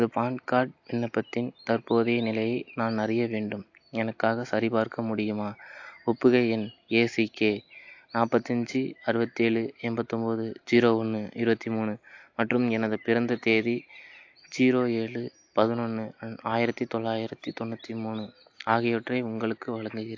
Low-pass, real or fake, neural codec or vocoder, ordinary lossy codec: 7.2 kHz; real; none; AAC, 48 kbps